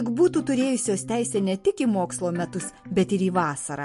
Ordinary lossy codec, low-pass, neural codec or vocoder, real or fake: MP3, 48 kbps; 14.4 kHz; none; real